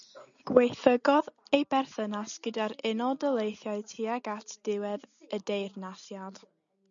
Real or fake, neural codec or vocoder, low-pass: real; none; 7.2 kHz